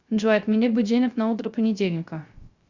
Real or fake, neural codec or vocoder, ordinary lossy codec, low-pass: fake; codec, 16 kHz, 0.3 kbps, FocalCodec; Opus, 64 kbps; 7.2 kHz